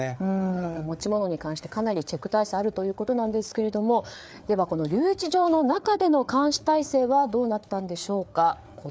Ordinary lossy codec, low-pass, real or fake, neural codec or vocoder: none; none; fake; codec, 16 kHz, 4 kbps, FreqCodec, larger model